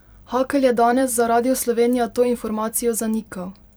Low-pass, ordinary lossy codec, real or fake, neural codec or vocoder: none; none; fake; vocoder, 44.1 kHz, 128 mel bands every 256 samples, BigVGAN v2